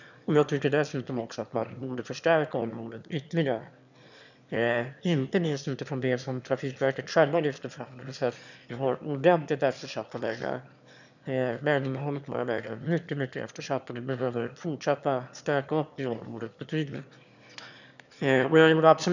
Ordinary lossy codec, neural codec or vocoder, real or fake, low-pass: none; autoencoder, 22.05 kHz, a latent of 192 numbers a frame, VITS, trained on one speaker; fake; 7.2 kHz